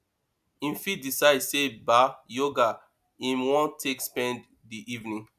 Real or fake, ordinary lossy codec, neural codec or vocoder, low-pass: real; none; none; 14.4 kHz